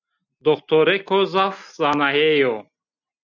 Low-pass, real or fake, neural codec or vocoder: 7.2 kHz; real; none